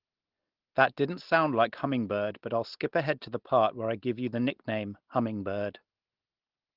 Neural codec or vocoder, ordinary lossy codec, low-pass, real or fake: none; Opus, 16 kbps; 5.4 kHz; real